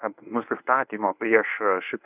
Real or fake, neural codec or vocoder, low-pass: fake; codec, 24 kHz, 0.9 kbps, WavTokenizer, medium speech release version 1; 3.6 kHz